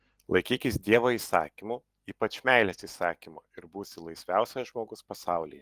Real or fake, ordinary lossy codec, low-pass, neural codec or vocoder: real; Opus, 24 kbps; 14.4 kHz; none